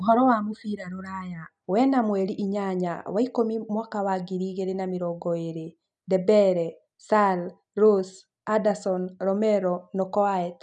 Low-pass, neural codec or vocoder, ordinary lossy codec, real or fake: 9.9 kHz; none; none; real